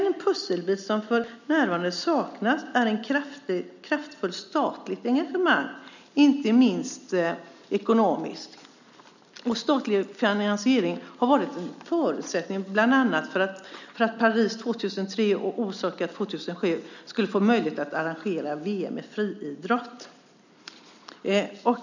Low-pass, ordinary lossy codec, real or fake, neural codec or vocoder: 7.2 kHz; none; real; none